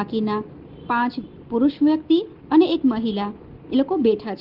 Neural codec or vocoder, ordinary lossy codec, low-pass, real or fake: none; Opus, 16 kbps; 5.4 kHz; real